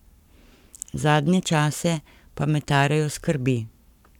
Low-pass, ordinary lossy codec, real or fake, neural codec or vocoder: 19.8 kHz; none; fake; codec, 44.1 kHz, 7.8 kbps, Pupu-Codec